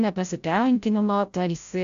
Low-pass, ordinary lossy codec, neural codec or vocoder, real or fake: 7.2 kHz; AAC, 64 kbps; codec, 16 kHz, 0.5 kbps, FreqCodec, larger model; fake